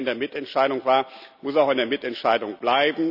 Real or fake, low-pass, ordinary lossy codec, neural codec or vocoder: real; 5.4 kHz; none; none